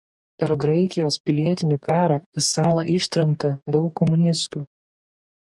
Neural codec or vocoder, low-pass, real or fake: codec, 44.1 kHz, 2.6 kbps, DAC; 10.8 kHz; fake